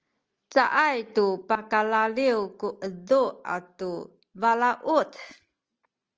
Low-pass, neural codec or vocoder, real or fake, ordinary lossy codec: 7.2 kHz; none; real; Opus, 24 kbps